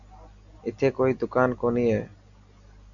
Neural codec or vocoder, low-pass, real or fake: none; 7.2 kHz; real